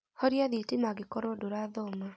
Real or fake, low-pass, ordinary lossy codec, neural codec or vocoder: real; none; none; none